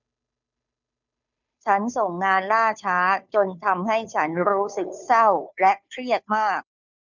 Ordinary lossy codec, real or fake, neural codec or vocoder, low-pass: none; fake; codec, 16 kHz, 2 kbps, FunCodec, trained on Chinese and English, 25 frames a second; 7.2 kHz